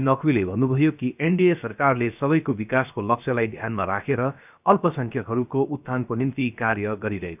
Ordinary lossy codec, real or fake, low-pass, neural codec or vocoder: none; fake; 3.6 kHz; codec, 16 kHz, 0.7 kbps, FocalCodec